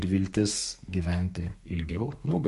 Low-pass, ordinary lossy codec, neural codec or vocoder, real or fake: 14.4 kHz; MP3, 48 kbps; codec, 32 kHz, 1.9 kbps, SNAC; fake